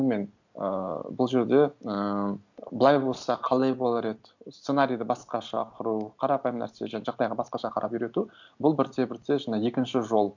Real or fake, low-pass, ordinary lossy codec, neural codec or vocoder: real; 7.2 kHz; none; none